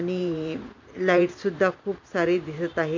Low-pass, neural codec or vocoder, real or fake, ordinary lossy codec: 7.2 kHz; vocoder, 44.1 kHz, 128 mel bands every 512 samples, BigVGAN v2; fake; MP3, 48 kbps